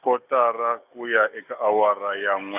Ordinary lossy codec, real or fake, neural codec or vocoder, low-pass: none; real; none; 3.6 kHz